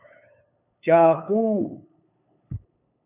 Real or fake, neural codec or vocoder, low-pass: fake; codec, 16 kHz, 8 kbps, FunCodec, trained on LibriTTS, 25 frames a second; 3.6 kHz